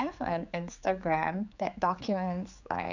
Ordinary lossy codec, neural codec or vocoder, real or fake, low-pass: none; codec, 16 kHz, 4 kbps, X-Codec, HuBERT features, trained on general audio; fake; 7.2 kHz